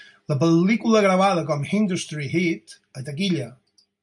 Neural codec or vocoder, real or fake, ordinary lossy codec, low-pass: none; real; MP3, 96 kbps; 10.8 kHz